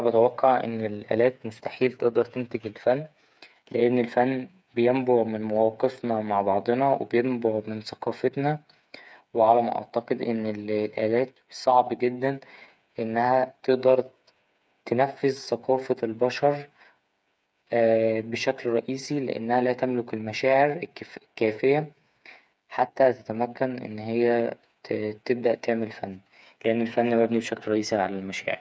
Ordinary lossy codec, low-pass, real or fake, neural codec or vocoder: none; none; fake; codec, 16 kHz, 8 kbps, FreqCodec, smaller model